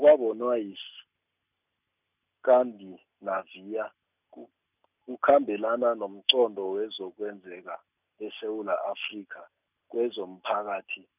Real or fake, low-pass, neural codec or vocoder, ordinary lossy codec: real; 3.6 kHz; none; none